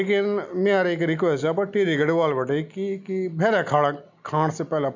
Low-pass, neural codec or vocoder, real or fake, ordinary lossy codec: 7.2 kHz; none; real; none